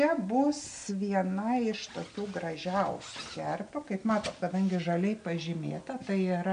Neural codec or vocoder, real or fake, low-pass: none; real; 9.9 kHz